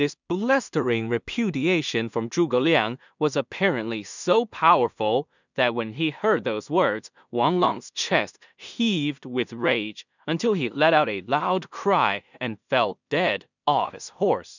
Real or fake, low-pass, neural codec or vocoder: fake; 7.2 kHz; codec, 16 kHz in and 24 kHz out, 0.4 kbps, LongCat-Audio-Codec, two codebook decoder